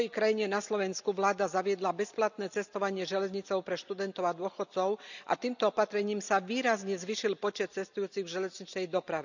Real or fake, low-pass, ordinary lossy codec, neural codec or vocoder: real; 7.2 kHz; none; none